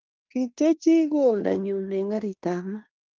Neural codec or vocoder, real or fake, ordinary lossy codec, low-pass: codec, 16 kHz in and 24 kHz out, 0.9 kbps, LongCat-Audio-Codec, fine tuned four codebook decoder; fake; Opus, 24 kbps; 7.2 kHz